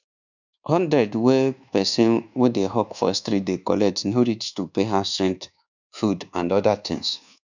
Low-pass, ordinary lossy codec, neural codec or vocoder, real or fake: 7.2 kHz; none; codec, 24 kHz, 1.2 kbps, DualCodec; fake